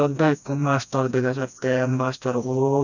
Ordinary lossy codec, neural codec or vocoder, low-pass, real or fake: none; codec, 16 kHz, 1 kbps, FreqCodec, smaller model; 7.2 kHz; fake